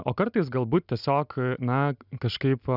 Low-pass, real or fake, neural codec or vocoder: 5.4 kHz; real; none